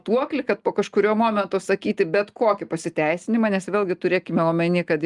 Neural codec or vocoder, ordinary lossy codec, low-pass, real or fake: none; Opus, 32 kbps; 10.8 kHz; real